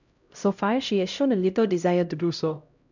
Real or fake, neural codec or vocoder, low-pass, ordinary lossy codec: fake; codec, 16 kHz, 0.5 kbps, X-Codec, HuBERT features, trained on LibriSpeech; 7.2 kHz; none